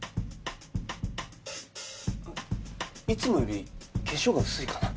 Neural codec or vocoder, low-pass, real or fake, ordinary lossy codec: none; none; real; none